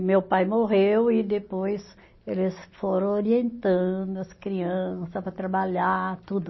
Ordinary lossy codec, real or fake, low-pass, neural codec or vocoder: MP3, 24 kbps; real; 7.2 kHz; none